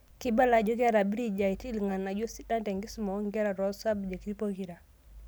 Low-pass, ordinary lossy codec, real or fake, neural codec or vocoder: none; none; real; none